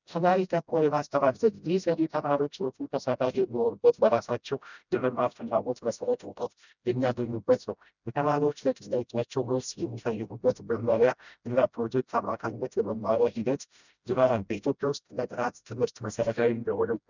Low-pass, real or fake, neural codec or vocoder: 7.2 kHz; fake; codec, 16 kHz, 0.5 kbps, FreqCodec, smaller model